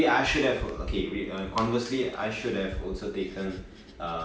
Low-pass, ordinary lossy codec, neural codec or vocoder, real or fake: none; none; none; real